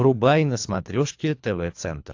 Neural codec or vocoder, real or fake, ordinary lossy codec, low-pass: codec, 24 kHz, 3 kbps, HILCodec; fake; AAC, 48 kbps; 7.2 kHz